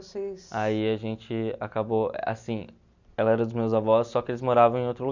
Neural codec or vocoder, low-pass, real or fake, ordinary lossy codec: none; 7.2 kHz; real; none